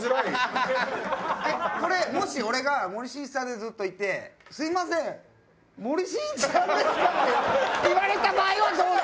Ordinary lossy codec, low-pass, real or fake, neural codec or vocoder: none; none; real; none